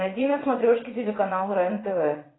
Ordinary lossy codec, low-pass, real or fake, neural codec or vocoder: AAC, 16 kbps; 7.2 kHz; fake; vocoder, 22.05 kHz, 80 mel bands, WaveNeXt